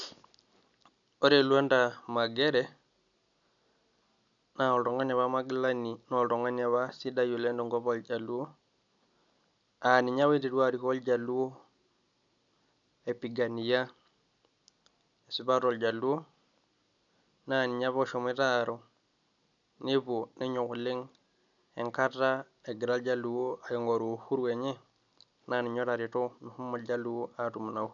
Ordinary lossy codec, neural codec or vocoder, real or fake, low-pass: none; none; real; 7.2 kHz